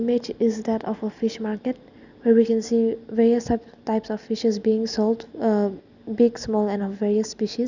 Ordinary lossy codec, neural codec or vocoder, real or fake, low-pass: none; none; real; 7.2 kHz